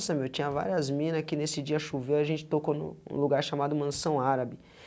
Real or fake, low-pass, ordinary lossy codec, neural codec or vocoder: real; none; none; none